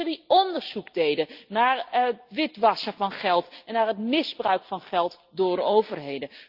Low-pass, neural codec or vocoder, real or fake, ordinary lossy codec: 5.4 kHz; none; real; Opus, 24 kbps